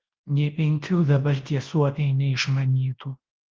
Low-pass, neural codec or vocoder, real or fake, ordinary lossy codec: 7.2 kHz; codec, 24 kHz, 0.9 kbps, WavTokenizer, large speech release; fake; Opus, 16 kbps